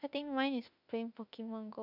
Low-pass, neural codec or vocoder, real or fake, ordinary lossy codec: 5.4 kHz; autoencoder, 48 kHz, 32 numbers a frame, DAC-VAE, trained on Japanese speech; fake; none